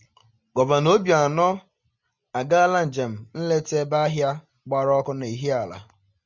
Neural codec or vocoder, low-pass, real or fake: none; 7.2 kHz; real